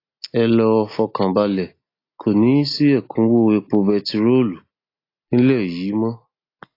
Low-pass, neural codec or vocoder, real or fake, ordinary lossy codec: 5.4 kHz; none; real; AAC, 32 kbps